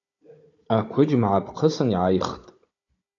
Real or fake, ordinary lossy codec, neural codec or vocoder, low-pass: fake; AAC, 32 kbps; codec, 16 kHz, 16 kbps, FunCodec, trained on Chinese and English, 50 frames a second; 7.2 kHz